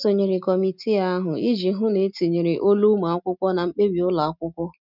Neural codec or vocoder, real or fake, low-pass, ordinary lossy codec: none; real; 5.4 kHz; none